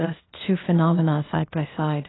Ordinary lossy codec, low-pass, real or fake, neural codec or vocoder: AAC, 16 kbps; 7.2 kHz; fake; codec, 16 kHz, 0.8 kbps, ZipCodec